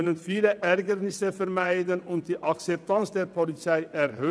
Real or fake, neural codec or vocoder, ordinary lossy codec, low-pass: fake; vocoder, 22.05 kHz, 80 mel bands, WaveNeXt; none; none